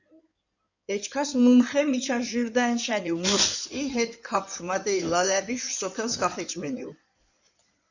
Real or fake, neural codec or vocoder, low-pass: fake; codec, 16 kHz in and 24 kHz out, 2.2 kbps, FireRedTTS-2 codec; 7.2 kHz